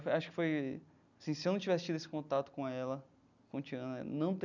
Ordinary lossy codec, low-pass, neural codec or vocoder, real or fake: none; 7.2 kHz; none; real